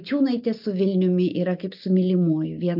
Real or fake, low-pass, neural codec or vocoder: real; 5.4 kHz; none